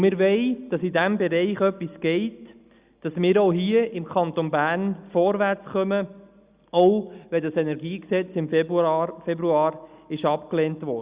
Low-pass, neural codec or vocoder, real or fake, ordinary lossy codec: 3.6 kHz; none; real; Opus, 64 kbps